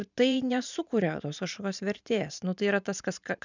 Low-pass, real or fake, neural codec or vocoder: 7.2 kHz; fake; vocoder, 44.1 kHz, 128 mel bands every 512 samples, BigVGAN v2